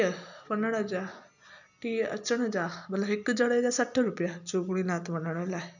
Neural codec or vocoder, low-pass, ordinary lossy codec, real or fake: none; 7.2 kHz; none; real